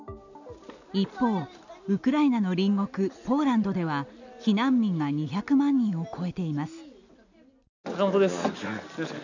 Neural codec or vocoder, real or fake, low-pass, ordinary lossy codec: none; real; 7.2 kHz; none